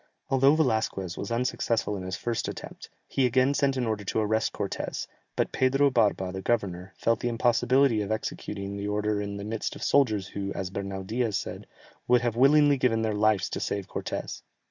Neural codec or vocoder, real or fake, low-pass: none; real; 7.2 kHz